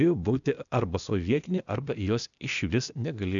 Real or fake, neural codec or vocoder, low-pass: fake; codec, 16 kHz, 0.8 kbps, ZipCodec; 7.2 kHz